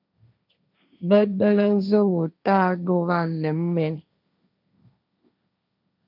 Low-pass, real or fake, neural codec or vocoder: 5.4 kHz; fake; codec, 16 kHz, 1.1 kbps, Voila-Tokenizer